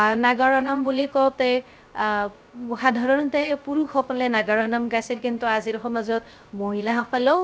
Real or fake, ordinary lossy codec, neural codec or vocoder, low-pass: fake; none; codec, 16 kHz, 0.3 kbps, FocalCodec; none